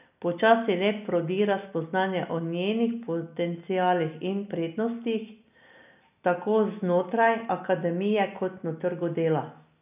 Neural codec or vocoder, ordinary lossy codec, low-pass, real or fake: none; none; 3.6 kHz; real